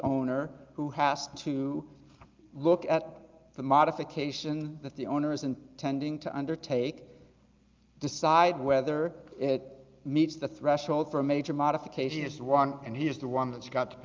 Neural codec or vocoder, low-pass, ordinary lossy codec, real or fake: none; 7.2 kHz; Opus, 32 kbps; real